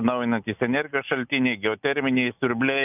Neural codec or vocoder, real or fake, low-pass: none; real; 3.6 kHz